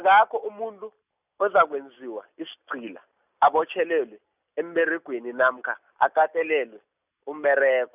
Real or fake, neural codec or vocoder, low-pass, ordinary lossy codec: real; none; 3.6 kHz; none